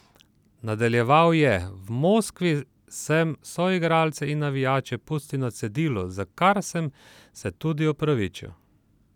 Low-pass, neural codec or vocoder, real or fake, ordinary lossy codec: 19.8 kHz; none; real; none